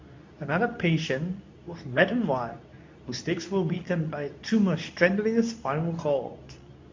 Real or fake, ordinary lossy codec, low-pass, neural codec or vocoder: fake; MP3, 48 kbps; 7.2 kHz; codec, 24 kHz, 0.9 kbps, WavTokenizer, medium speech release version 2